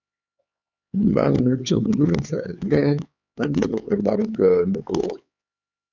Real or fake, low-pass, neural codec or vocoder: fake; 7.2 kHz; codec, 16 kHz, 4 kbps, X-Codec, HuBERT features, trained on LibriSpeech